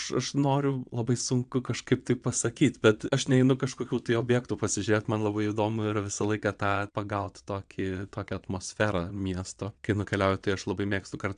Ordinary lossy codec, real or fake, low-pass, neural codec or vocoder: AAC, 96 kbps; fake; 9.9 kHz; vocoder, 22.05 kHz, 80 mel bands, WaveNeXt